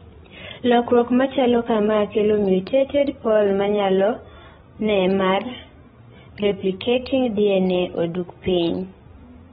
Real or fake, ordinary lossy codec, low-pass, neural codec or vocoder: fake; AAC, 16 kbps; 7.2 kHz; codec, 16 kHz, 16 kbps, FreqCodec, larger model